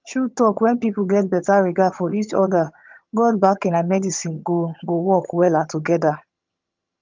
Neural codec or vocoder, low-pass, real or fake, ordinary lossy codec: vocoder, 22.05 kHz, 80 mel bands, HiFi-GAN; 7.2 kHz; fake; Opus, 24 kbps